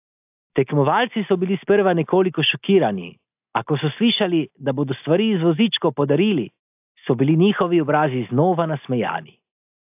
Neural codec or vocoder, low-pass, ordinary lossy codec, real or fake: none; 3.6 kHz; AAC, 32 kbps; real